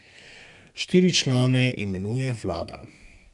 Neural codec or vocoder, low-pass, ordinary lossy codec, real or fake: codec, 32 kHz, 1.9 kbps, SNAC; 10.8 kHz; none; fake